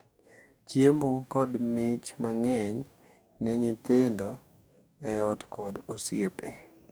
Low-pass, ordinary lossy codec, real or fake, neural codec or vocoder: none; none; fake; codec, 44.1 kHz, 2.6 kbps, DAC